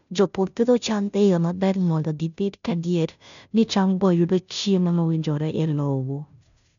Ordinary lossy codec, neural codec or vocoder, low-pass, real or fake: MP3, 96 kbps; codec, 16 kHz, 0.5 kbps, FunCodec, trained on Chinese and English, 25 frames a second; 7.2 kHz; fake